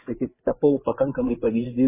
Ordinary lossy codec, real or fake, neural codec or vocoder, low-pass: MP3, 16 kbps; fake; vocoder, 24 kHz, 100 mel bands, Vocos; 3.6 kHz